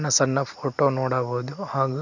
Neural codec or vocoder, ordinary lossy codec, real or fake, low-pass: none; none; real; 7.2 kHz